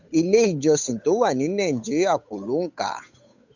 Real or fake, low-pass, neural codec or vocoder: fake; 7.2 kHz; codec, 16 kHz, 8 kbps, FunCodec, trained on Chinese and English, 25 frames a second